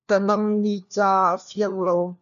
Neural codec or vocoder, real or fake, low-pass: codec, 16 kHz, 1 kbps, FunCodec, trained on LibriTTS, 50 frames a second; fake; 7.2 kHz